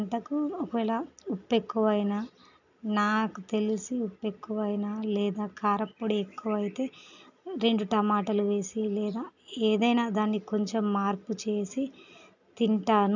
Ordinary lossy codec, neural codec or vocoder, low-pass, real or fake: none; none; 7.2 kHz; real